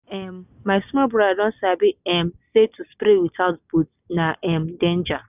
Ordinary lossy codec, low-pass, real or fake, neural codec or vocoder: none; 3.6 kHz; real; none